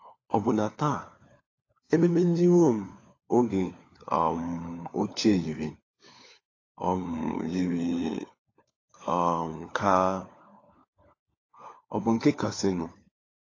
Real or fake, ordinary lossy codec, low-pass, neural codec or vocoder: fake; AAC, 32 kbps; 7.2 kHz; codec, 16 kHz, 4 kbps, FunCodec, trained on LibriTTS, 50 frames a second